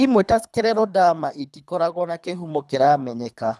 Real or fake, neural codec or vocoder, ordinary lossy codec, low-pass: fake; codec, 24 kHz, 3 kbps, HILCodec; none; none